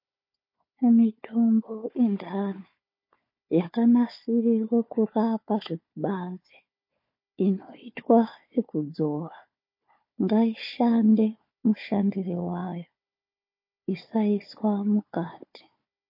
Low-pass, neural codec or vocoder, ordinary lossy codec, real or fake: 5.4 kHz; codec, 16 kHz, 4 kbps, FunCodec, trained on Chinese and English, 50 frames a second; MP3, 32 kbps; fake